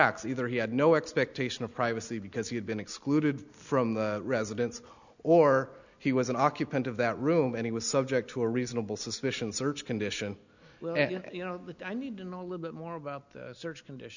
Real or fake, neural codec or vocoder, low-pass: real; none; 7.2 kHz